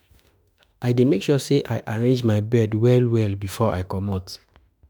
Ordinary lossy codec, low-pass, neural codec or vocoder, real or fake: none; none; autoencoder, 48 kHz, 32 numbers a frame, DAC-VAE, trained on Japanese speech; fake